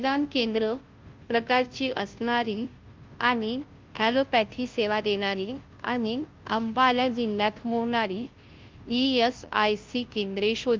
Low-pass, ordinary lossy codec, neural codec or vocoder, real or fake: 7.2 kHz; Opus, 32 kbps; codec, 16 kHz, 0.5 kbps, FunCodec, trained on Chinese and English, 25 frames a second; fake